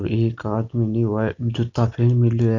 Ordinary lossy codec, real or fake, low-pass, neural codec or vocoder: none; real; 7.2 kHz; none